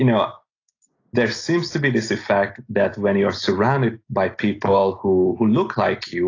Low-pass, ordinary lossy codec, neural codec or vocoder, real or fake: 7.2 kHz; AAC, 32 kbps; none; real